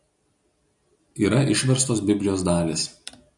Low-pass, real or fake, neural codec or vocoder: 10.8 kHz; real; none